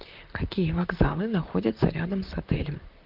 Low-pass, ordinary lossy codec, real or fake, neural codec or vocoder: 5.4 kHz; Opus, 32 kbps; fake; vocoder, 44.1 kHz, 128 mel bands, Pupu-Vocoder